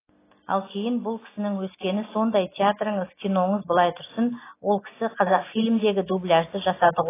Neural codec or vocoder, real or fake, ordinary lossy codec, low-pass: none; real; AAC, 16 kbps; 3.6 kHz